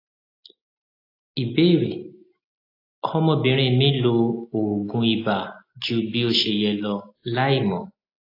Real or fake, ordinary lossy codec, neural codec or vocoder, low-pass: real; AAC, 32 kbps; none; 5.4 kHz